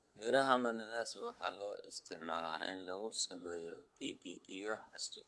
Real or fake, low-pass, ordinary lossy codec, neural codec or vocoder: fake; none; none; codec, 24 kHz, 1 kbps, SNAC